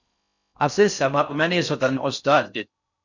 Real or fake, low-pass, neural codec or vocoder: fake; 7.2 kHz; codec, 16 kHz in and 24 kHz out, 0.6 kbps, FocalCodec, streaming, 4096 codes